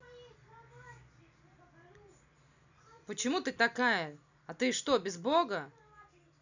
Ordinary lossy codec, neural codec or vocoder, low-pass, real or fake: none; none; 7.2 kHz; real